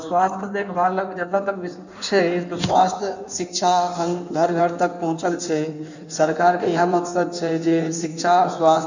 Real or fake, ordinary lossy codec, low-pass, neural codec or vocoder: fake; none; 7.2 kHz; codec, 16 kHz in and 24 kHz out, 1.1 kbps, FireRedTTS-2 codec